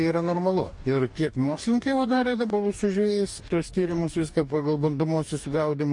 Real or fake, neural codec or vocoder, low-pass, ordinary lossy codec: fake; codec, 44.1 kHz, 2.6 kbps, DAC; 10.8 kHz; MP3, 48 kbps